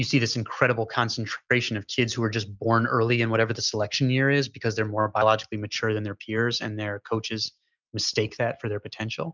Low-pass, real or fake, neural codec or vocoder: 7.2 kHz; real; none